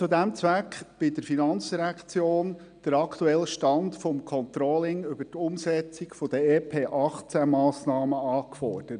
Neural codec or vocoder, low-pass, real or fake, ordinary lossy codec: none; 9.9 kHz; real; none